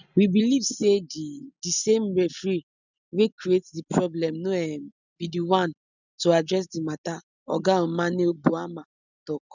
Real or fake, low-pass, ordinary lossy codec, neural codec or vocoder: real; 7.2 kHz; none; none